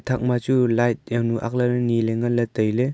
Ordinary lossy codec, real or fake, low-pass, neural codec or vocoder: none; real; none; none